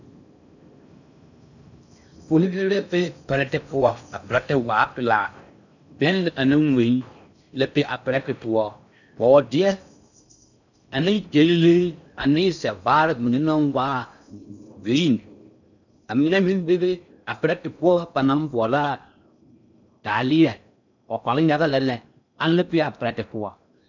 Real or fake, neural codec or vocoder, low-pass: fake; codec, 16 kHz in and 24 kHz out, 0.6 kbps, FocalCodec, streaming, 4096 codes; 7.2 kHz